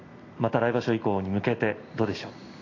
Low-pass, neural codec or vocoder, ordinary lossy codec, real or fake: 7.2 kHz; none; AAC, 32 kbps; real